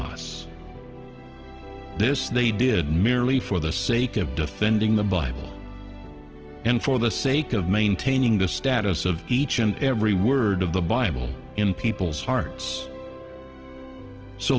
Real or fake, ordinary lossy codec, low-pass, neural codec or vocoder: real; Opus, 16 kbps; 7.2 kHz; none